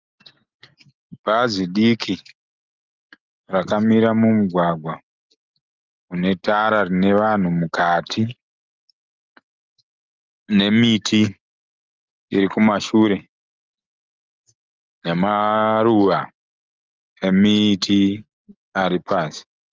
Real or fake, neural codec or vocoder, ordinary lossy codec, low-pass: real; none; Opus, 24 kbps; 7.2 kHz